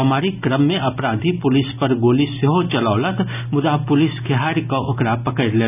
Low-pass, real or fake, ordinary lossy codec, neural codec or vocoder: 3.6 kHz; real; none; none